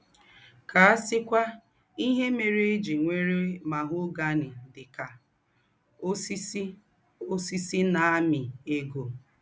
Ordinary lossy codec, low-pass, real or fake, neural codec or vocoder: none; none; real; none